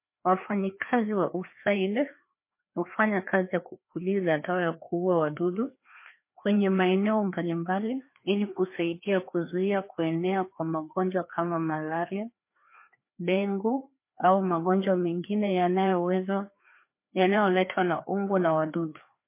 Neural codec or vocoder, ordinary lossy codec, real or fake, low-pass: codec, 16 kHz, 2 kbps, FreqCodec, larger model; MP3, 24 kbps; fake; 3.6 kHz